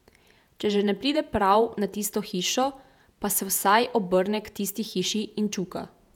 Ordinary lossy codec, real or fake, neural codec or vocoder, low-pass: none; fake; vocoder, 48 kHz, 128 mel bands, Vocos; 19.8 kHz